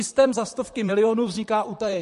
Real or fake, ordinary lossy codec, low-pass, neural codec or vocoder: fake; MP3, 48 kbps; 14.4 kHz; vocoder, 44.1 kHz, 128 mel bands, Pupu-Vocoder